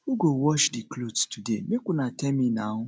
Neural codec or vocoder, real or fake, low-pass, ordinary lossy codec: none; real; none; none